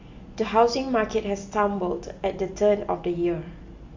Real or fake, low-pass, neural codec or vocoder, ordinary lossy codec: real; 7.2 kHz; none; AAC, 48 kbps